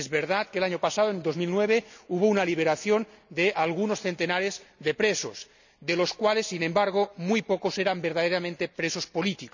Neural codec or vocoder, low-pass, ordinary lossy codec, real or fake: none; 7.2 kHz; none; real